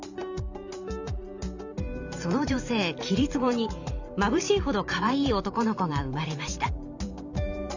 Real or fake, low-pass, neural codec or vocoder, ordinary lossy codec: fake; 7.2 kHz; vocoder, 44.1 kHz, 128 mel bands every 512 samples, BigVGAN v2; none